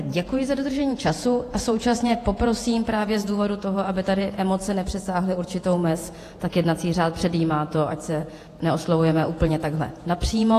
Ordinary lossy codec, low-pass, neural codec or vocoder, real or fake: AAC, 48 kbps; 14.4 kHz; vocoder, 48 kHz, 128 mel bands, Vocos; fake